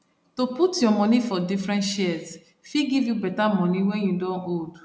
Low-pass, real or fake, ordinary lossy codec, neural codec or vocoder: none; real; none; none